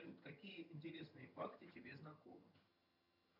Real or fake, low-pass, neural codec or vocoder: fake; 5.4 kHz; vocoder, 22.05 kHz, 80 mel bands, HiFi-GAN